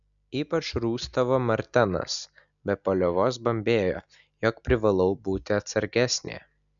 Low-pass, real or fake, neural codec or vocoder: 7.2 kHz; real; none